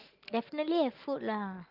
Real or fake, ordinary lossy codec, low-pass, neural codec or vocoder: real; Opus, 32 kbps; 5.4 kHz; none